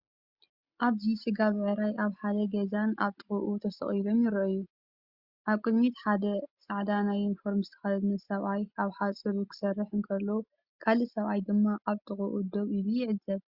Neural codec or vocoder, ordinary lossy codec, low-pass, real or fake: none; Opus, 64 kbps; 5.4 kHz; real